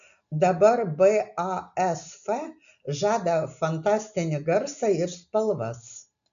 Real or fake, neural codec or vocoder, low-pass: real; none; 7.2 kHz